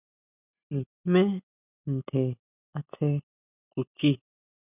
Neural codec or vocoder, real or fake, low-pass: none; real; 3.6 kHz